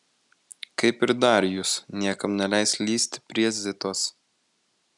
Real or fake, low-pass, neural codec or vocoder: real; 10.8 kHz; none